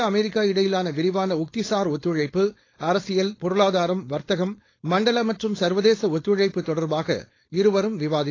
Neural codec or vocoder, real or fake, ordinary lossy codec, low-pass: codec, 16 kHz, 4.8 kbps, FACodec; fake; AAC, 32 kbps; 7.2 kHz